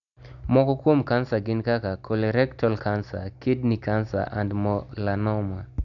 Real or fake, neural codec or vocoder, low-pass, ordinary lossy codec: real; none; 7.2 kHz; none